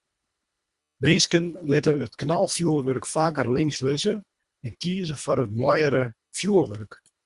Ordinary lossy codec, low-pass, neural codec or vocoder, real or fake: Opus, 64 kbps; 10.8 kHz; codec, 24 kHz, 1.5 kbps, HILCodec; fake